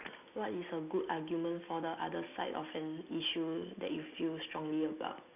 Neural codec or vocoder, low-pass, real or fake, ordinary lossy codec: none; 3.6 kHz; real; none